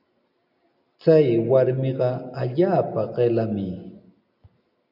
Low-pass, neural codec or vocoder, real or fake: 5.4 kHz; none; real